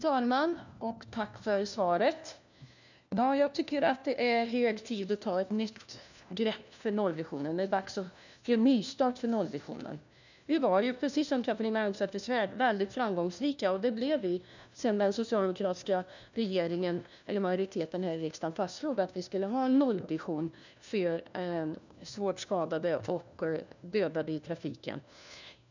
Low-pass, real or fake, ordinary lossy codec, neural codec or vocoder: 7.2 kHz; fake; none; codec, 16 kHz, 1 kbps, FunCodec, trained on LibriTTS, 50 frames a second